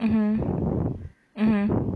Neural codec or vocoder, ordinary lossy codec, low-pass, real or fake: none; none; none; real